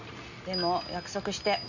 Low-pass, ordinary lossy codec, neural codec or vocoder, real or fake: 7.2 kHz; none; none; real